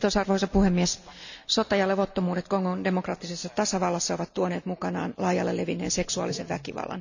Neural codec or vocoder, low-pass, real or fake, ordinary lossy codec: none; 7.2 kHz; real; none